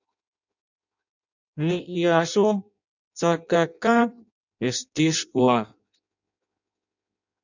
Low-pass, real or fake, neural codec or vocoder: 7.2 kHz; fake; codec, 16 kHz in and 24 kHz out, 0.6 kbps, FireRedTTS-2 codec